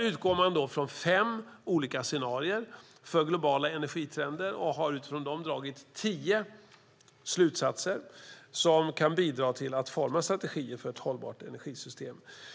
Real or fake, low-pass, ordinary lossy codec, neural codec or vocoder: real; none; none; none